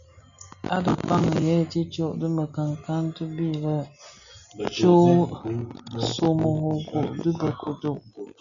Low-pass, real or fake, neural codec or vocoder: 7.2 kHz; real; none